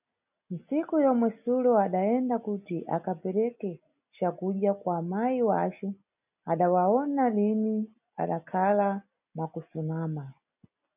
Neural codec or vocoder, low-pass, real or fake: none; 3.6 kHz; real